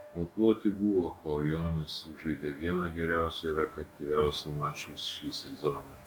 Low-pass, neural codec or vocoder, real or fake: 19.8 kHz; codec, 44.1 kHz, 2.6 kbps, DAC; fake